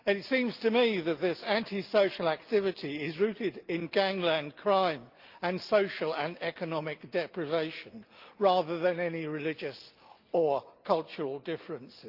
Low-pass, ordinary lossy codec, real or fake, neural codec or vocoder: 5.4 kHz; Opus, 24 kbps; real; none